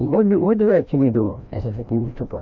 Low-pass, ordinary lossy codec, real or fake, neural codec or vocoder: 7.2 kHz; MP3, 64 kbps; fake; codec, 16 kHz, 1 kbps, FreqCodec, larger model